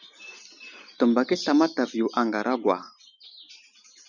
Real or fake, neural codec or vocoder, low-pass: real; none; 7.2 kHz